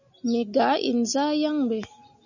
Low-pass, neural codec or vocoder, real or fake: 7.2 kHz; none; real